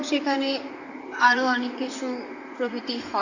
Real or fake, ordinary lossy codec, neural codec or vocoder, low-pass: fake; AAC, 48 kbps; vocoder, 44.1 kHz, 128 mel bands, Pupu-Vocoder; 7.2 kHz